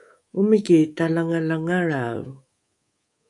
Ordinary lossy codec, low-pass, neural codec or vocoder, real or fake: AAC, 64 kbps; 10.8 kHz; codec, 24 kHz, 3.1 kbps, DualCodec; fake